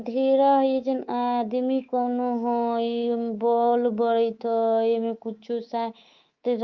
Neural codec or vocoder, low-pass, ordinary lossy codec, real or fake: codec, 24 kHz, 3.1 kbps, DualCodec; 7.2 kHz; Opus, 24 kbps; fake